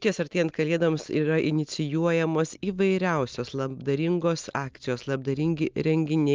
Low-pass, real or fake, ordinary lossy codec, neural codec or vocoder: 7.2 kHz; real; Opus, 32 kbps; none